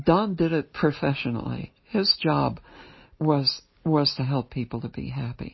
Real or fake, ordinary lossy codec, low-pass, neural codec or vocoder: real; MP3, 24 kbps; 7.2 kHz; none